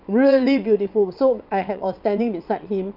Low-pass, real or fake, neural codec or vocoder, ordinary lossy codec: 5.4 kHz; fake; vocoder, 22.05 kHz, 80 mel bands, WaveNeXt; none